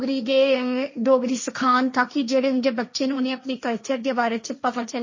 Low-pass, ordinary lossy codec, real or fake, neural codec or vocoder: 7.2 kHz; MP3, 32 kbps; fake; codec, 16 kHz, 1.1 kbps, Voila-Tokenizer